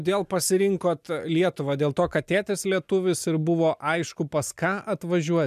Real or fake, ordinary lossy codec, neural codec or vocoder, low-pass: real; MP3, 96 kbps; none; 14.4 kHz